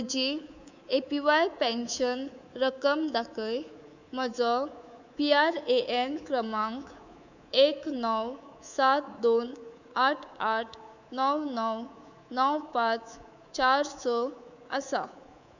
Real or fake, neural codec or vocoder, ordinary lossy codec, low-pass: fake; codec, 24 kHz, 3.1 kbps, DualCodec; none; 7.2 kHz